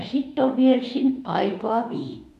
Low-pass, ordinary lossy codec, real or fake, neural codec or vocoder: 14.4 kHz; none; fake; autoencoder, 48 kHz, 32 numbers a frame, DAC-VAE, trained on Japanese speech